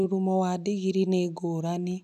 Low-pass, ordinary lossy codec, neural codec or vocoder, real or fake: 14.4 kHz; none; none; real